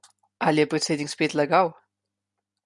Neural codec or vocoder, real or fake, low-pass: none; real; 10.8 kHz